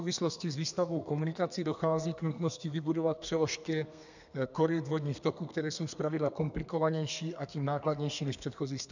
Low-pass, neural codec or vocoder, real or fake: 7.2 kHz; codec, 32 kHz, 1.9 kbps, SNAC; fake